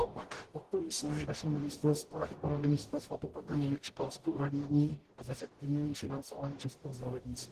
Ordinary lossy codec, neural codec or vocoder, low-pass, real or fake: Opus, 16 kbps; codec, 44.1 kHz, 0.9 kbps, DAC; 14.4 kHz; fake